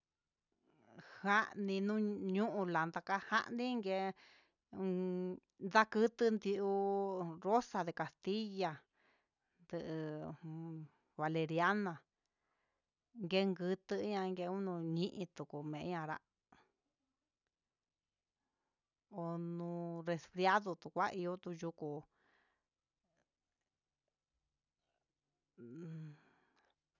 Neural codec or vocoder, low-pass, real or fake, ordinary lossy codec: none; 7.2 kHz; real; none